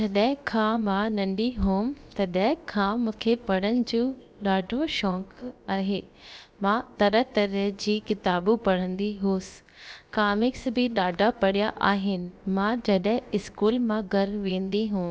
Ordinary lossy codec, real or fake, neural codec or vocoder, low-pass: none; fake; codec, 16 kHz, about 1 kbps, DyCAST, with the encoder's durations; none